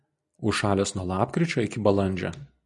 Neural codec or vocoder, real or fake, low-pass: none; real; 10.8 kHz